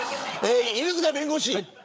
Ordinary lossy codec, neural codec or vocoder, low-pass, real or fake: none; codec, 16 kHz, 8 kbps, FreqCodec, larger model; none; fake